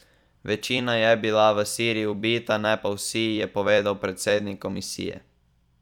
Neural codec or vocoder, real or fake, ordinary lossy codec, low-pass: vocoder, 44.1 kHz, 128 mel bands every 256 samples, BigVGAN v2; fake; none; 19.8 kHz